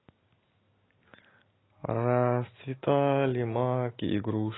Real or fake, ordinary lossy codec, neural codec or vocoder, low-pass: real; AAC, 16 kbps; none; 7.2 kHz